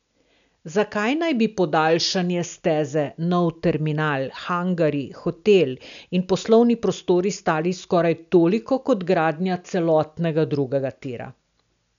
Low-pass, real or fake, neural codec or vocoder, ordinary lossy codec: 7.2 kHz; real; none; none